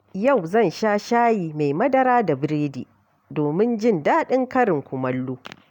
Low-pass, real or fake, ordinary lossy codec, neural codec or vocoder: 19.8 kHz; real; none; none